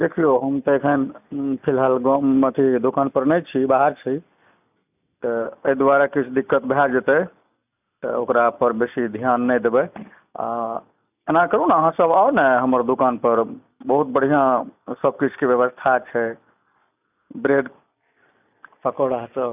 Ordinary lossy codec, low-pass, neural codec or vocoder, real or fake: none; 3.6 kHz; none; real